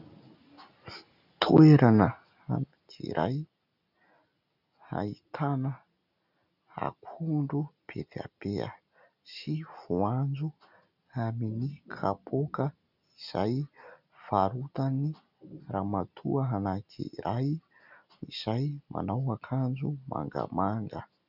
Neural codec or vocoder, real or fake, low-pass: none; real; 5.4 kHz